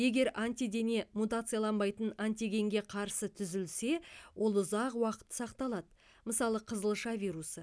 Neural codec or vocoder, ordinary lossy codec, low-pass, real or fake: none; none; none; real